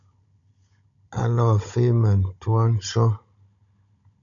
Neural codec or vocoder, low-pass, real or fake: codec, 16 kHz, 16 kbps, FunCodec, trained on Chinese and English, 50 frames a second; 7.2 kHz; fake